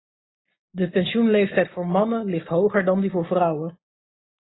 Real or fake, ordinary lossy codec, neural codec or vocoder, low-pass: real; AAC, 16 kbps; none; 7.2 kHz